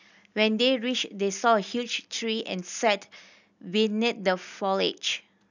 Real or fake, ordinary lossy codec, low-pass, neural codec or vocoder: real; none; 7.2 kHz; none